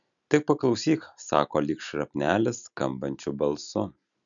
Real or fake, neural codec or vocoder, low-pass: real; none; 7.2 kHz